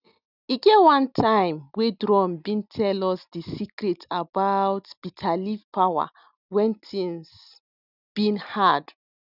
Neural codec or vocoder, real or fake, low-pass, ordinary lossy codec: none; real; 5.4 kHz; none